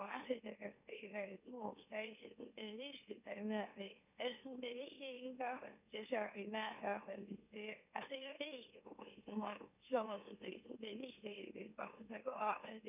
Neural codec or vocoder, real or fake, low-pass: autoencoder, 44.1 kHz, a latent of 192 numbers a frame, MeloTTS; fake; 3.6 kHz